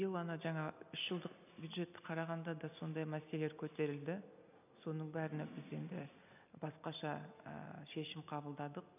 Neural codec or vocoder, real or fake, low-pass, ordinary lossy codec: none; real; 3.6 kHz; none